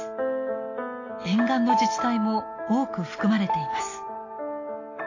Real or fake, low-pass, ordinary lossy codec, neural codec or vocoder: real; 7.2 kHz; AAC, 32 kbps; none